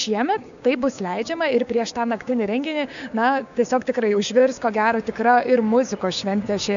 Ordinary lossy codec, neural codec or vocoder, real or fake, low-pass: MP3, 96 kbps; codec, 16 kHz, 6 kbps, DAC; fake; 7.2 kHz